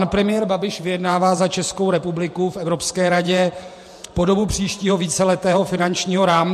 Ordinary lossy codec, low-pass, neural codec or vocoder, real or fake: MP3, 64 kbps; 14.4 kHz; vocoder, 48 kHz, 128 mel bands, Vocos; fake